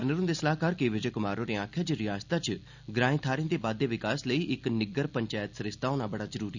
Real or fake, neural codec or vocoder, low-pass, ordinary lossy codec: real; none; 7.2 kHz; none